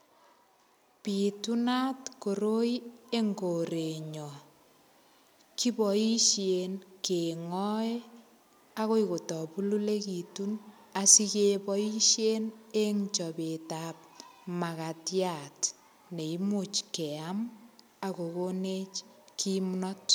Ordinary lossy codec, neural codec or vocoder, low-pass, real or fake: none; none; none; real